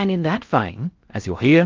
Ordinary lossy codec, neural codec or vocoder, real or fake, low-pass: Opus, 24 kbps; codec, 16 kHz in and 24 kHz out, 0.6 kbps, FocalCodec, streaming, 4096 codes; fake; 7.2 kHz